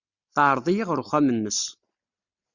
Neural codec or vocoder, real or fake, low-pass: none; real; 7.2 kHz